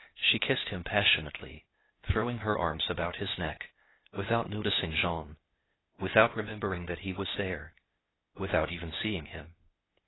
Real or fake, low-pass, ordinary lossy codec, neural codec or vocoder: fake; 7.2 kHz; AAC, 16 kbps; codec, 16 kHz, 0.8 kbps, ZipCodec